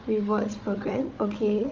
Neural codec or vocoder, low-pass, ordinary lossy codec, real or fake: codec, 16 kHz, 4 kbps, FunCodec, trained on Chinese and English, 50 frames a second; 7.2 kHz; Opus, 32 kbps; fake